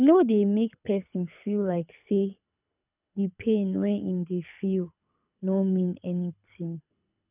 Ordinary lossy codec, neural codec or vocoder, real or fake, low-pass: none; codec, 24 kHz, 6 kbps, HILCodec; fake; 3.6 kHz